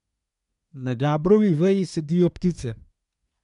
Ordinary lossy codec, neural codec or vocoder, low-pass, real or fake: MP3, 96 kbps; codec, 24 kHz, 1 kbps, SNAC; 10.8 kHz; fake